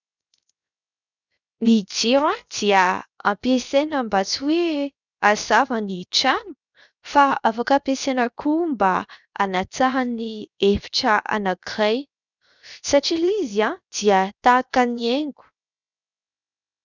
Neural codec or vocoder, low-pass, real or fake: codec, 16 kHz, 0.7 kbps, FocalCodec; 7.2 kHz; fake